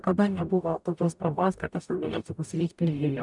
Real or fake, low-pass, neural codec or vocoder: fake; 10.8 kHz; codec, 44.1 kHz, 0.9 kbps, DAC